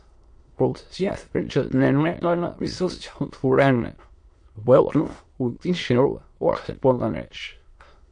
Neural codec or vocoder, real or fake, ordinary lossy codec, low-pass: autoencoder, 22.05 kHz, a latent of 192 numbers a frame, VITS, trained on many speakers; fake; MP3, 48 kbps; 9.9 kHz